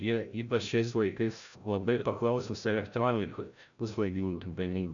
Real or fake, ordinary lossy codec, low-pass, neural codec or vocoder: fake; MP3, 96 kbps; 7.2 kHz; codec, 16 kHz, 0.5 kbps, FreqCodec, larger model